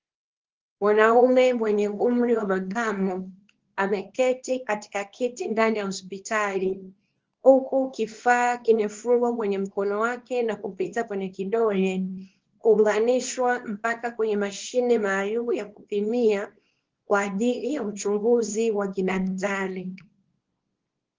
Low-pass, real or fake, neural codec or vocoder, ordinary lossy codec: 7.2 kHz; fake; codec, 24 kHz, 0.9 kbps, WavTokenizer, small release; Opus, 16 kbps